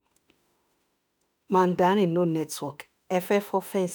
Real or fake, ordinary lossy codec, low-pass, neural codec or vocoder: fake; none; none; autoencoder, 48 kHz, 32 numbers a frame, DAC-VAE, trained on Japanese speech